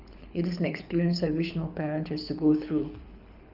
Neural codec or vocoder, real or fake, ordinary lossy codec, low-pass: codec, 24 kHz, 6 kbps, HILCodec; fake; none; 5.4 kHz